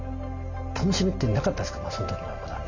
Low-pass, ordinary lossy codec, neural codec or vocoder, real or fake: 7.2 kHz; none; none; real